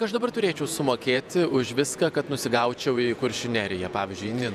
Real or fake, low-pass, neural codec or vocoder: fake; 14.4 kHz; vocoder, 48 kHz, 128 mel bands, Vocos